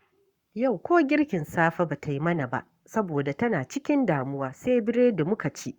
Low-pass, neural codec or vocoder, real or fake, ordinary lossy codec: 19.8 kHz; codec, 44.1 kHz, 7.8 kbps, Pupu-Codec; fake; Opus, 64 kbps